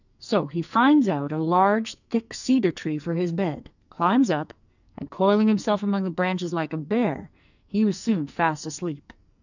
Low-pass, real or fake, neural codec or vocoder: 7.2 kHz; fake; codec, 44.1 kHz, 2.6 kbps, SNAC